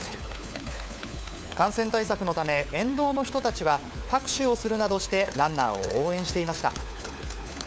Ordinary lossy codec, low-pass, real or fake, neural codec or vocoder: none; none; fake; codec, 16 kHz, 4 kbps, FunCodec, trained on LibriTTS, 50 frames a second